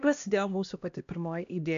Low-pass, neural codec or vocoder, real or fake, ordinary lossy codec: 7.2 kHz; codec, 16 kHz, 1 kbps, X-Codec, HuBERT features, trained on LibriSpeech; fake; AAC, 64 kbps